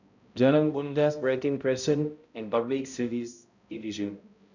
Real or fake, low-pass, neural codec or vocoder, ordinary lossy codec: fake; 7.2 kHz; codec, 16 kHz, 0.5 kbps, X-Codec, HuBERT features, trained on balanced general audio; none